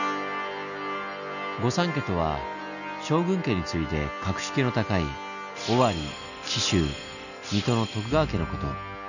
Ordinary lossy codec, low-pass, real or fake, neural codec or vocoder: none; 7.2 kHz; real; none